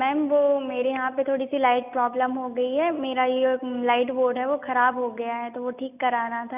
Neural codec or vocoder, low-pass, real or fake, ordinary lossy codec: none; 3.6 kHz; real; none